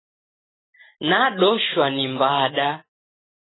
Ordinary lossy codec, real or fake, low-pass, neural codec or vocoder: AAC, 16 kbps; real; 7.2 kHz; none